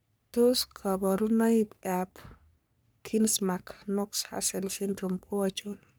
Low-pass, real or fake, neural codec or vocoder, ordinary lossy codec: none; fake; codec, 44.1 kHz, 3.4 kbps, Pupu-Codec; none